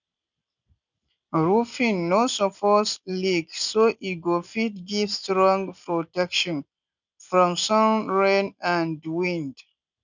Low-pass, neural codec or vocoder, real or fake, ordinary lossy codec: 7.2 kHz; none; real; none